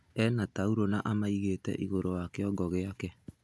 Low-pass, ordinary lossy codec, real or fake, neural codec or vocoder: none; none; real; none